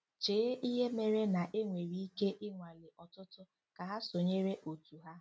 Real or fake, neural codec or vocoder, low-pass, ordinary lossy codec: real; none; none; none